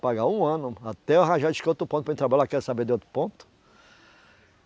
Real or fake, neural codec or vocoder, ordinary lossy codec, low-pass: real; none; none; none